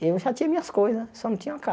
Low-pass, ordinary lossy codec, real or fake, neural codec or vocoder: none; none; real; none